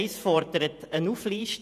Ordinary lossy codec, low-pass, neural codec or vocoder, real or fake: none; 14.4 kHz; vocoder, 48 kHz, 128 mel bands, Vocos; fake